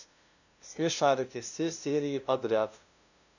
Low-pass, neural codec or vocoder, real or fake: 7.2 kHz; codec, 16 kHz, 0.5 kbps, FunCodec, trained on LibriTTS, 25 frames a second; fake